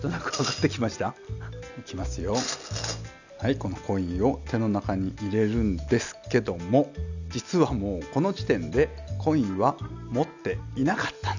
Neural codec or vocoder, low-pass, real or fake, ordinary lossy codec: none; 7.2 kHz; real; none